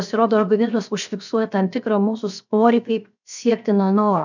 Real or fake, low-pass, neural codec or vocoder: fake; 7.2 kHz; codec, 16 kHz, 0.7 kbps, FocalCodec